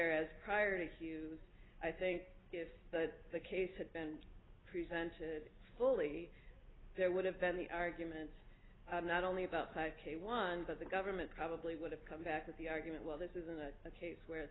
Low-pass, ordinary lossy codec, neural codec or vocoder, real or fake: 7.2 kHz; AAC, 16 kbps; none; real